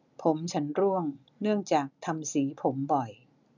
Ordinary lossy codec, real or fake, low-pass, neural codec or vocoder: none; real; 7.2 kHz; none